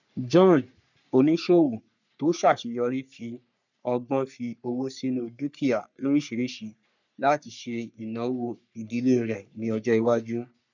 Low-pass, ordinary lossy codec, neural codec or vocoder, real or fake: 7.2 kHz; none; codec, 44.1 kHz, 3.4 kbps, Pupu-Codec; fake